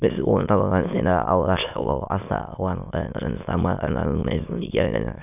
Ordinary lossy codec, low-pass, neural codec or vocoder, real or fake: none; 3.6 kHz; autoencoder, 22.05 kHz, a latent of 192 numbers a frame, VITS, trained on many speakers; fake